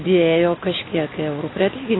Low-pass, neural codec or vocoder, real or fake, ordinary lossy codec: 7.2 kHz; none; real; AAC, 16 kbps